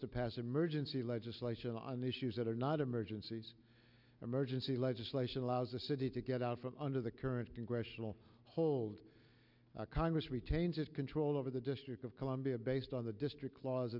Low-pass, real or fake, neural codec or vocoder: 5.4 kHz; real; none